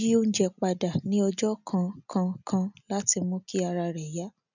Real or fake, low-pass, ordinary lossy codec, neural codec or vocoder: real; 7.2 kHz; none; none